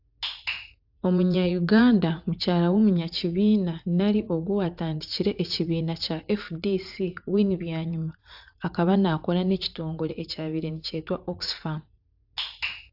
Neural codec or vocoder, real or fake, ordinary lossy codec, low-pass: vocoder, 44.1 kHz, 80 mel bands, Vocos; fake; AAC, 48 kbps; 5.4 kHz